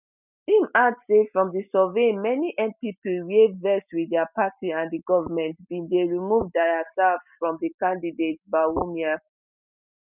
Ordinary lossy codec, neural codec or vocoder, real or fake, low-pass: none; none; real; 3.6 kHz